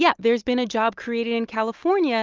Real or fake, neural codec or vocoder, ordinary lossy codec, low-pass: real; none; Opus, 24 kbps; 7.2 kHz